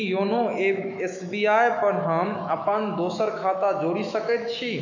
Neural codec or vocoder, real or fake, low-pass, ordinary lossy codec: autoencoder, 48 kHz, 128 numbers a frame, DAC-VAE, trained on Japanese speech; fake; 7.2 kHz; none